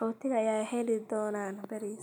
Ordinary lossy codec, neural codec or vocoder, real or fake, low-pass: none; none; real; none